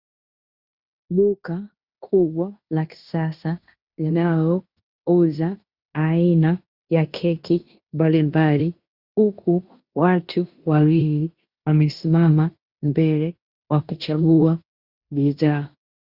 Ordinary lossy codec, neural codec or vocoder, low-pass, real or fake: Opus, 64 kbps; codec, 16 kHz in and 24 kHz out, 0.9 kbps, LongCat-Audio-Codec, fine tuned four codebook decoder; 5.4 kHz; fake